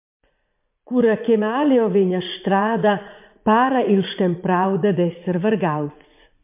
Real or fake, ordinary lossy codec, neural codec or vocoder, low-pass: fake; MP3, 32 kbps; vocoder, 44.1 kHz, 80 mel bands, Vocos; 3.6 kHz